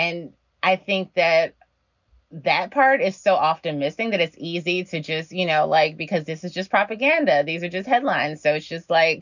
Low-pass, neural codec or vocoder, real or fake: 7.2 kHz; none; real